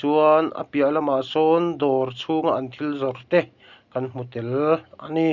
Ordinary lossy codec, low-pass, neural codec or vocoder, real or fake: none; 7.2 kHz; none; real